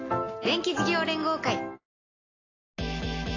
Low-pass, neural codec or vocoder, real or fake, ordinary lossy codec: 7.2 kHz; none; real; AAC, 32 kbps